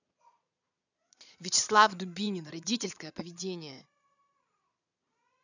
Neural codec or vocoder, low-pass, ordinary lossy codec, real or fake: vocoder, 22.05 kHz, 80 mel bands, Vocos; 7.2 kHz; none; fake